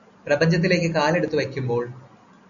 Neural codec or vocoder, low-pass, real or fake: none; 7.2 kHz; real